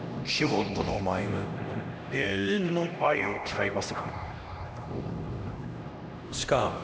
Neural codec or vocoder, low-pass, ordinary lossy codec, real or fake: codec, 16 kHz, 1 kbps, X-Codec, HuBERT features, trained on LibriSpeech; none; none; fake